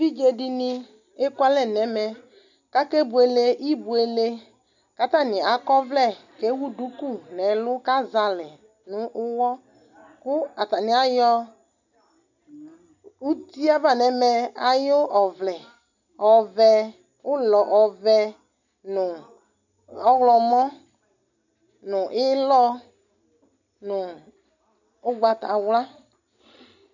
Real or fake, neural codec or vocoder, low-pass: real; none; 7.2 kHz